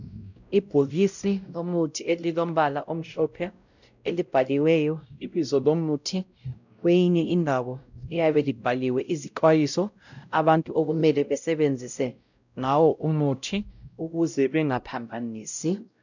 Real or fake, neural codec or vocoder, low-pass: fake; codec, 16 kHz, 0.5 kbps, X-Codec, WavLM features, trained on Multilingual LibriSpeech; 7.2 kHz